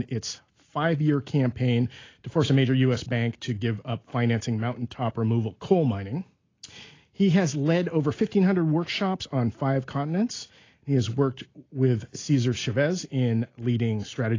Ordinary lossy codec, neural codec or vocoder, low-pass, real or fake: AAC, 32 kbps; none; 7.2 kHz; real